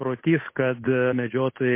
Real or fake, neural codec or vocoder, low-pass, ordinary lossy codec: fake; codec, 16 kHz, 8 kbps, FunCodec, trained on Chinese and English, 25 frames a second; 3.6 kHz; MP3, 24 kbps